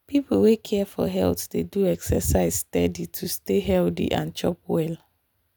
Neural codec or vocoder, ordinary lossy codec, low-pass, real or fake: none; none; none; real